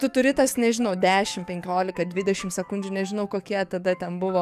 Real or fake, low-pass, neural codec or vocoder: fake; 14.4 kHz; codec, 44.1 kHz, 7.8 kbps, DAC